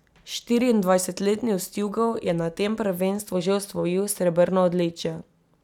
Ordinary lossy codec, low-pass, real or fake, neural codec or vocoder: none; 19.8 kHz; real; none